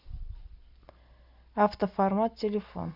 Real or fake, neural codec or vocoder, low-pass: real; none; 5.4 kHz